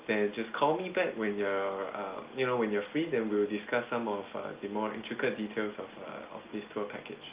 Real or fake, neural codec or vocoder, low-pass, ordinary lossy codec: real; none; 3.6 kHz; Opus, 24 kbps